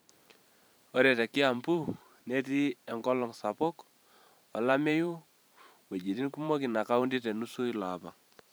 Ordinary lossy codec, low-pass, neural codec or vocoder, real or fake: none; none; vocoder, 44.1 kHz, 128 mel bands every 512 samples, BigVGAN v2; fake